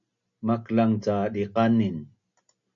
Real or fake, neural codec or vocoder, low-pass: real; none; 7.2 kHz